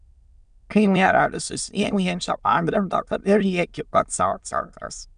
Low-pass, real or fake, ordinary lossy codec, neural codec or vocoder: 9.9 kHz; fake; MP3, 96 kbps; autoencoder, 22.05 kHz, a latent of 192 numbers a frame, VITS, trained on many speakers